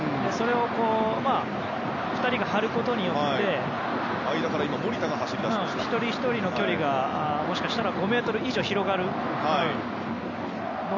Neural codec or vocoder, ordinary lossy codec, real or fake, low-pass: none; none; real; 7.2 kHz